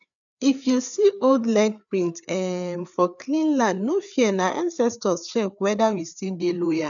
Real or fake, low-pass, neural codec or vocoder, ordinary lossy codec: fake; 7.2 kHz; codec, 16 kHz, 4 kbps, FreqCodec, larger model; none